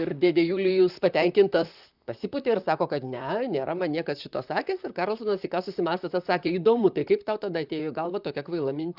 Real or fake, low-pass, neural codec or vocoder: fake; 5.4 kHz; vocoder, 44.1 kHz, 128 mel bands, Pupu-Vocoder